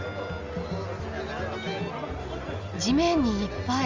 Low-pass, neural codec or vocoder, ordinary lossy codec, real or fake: 7.2 kHz; none; Opus, 32 kbps; real